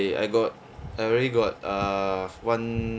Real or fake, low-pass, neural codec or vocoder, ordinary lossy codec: real; none; none; none